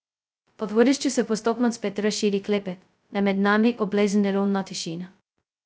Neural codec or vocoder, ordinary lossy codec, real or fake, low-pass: codec, 16 kHz, 0.2 kbps, FocalCodec; none; fake; none